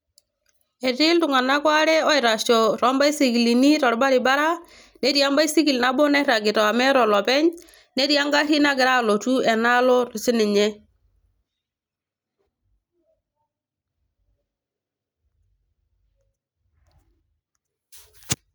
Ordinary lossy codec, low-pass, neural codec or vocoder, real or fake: none; none; none; real